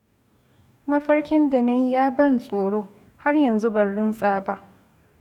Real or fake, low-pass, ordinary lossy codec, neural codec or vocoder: fake; 19.8 kHz; none; codec, 44.1 kHz, 2.6 kbps, DAC